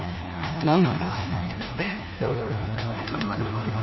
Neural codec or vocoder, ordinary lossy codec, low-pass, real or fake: codec, 16 kHz, 1 kbps, FunCodec, trained on LibriTTS, 50 frames a second; MP3, 24 kbps; 7.2 kHz; fake